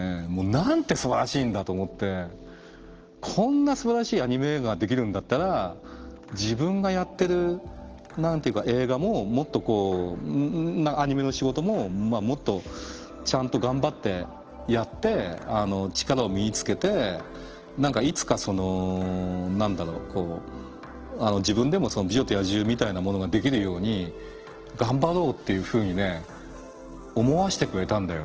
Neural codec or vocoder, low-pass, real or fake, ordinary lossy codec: none; 7.2 kHz; real; Opus, 16 kbps